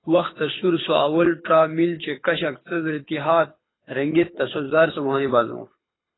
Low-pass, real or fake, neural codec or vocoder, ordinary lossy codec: 7.2 kHz; fake; codec, 24 kHz, 6 kbps, HILCodec; AAC, 16 kbps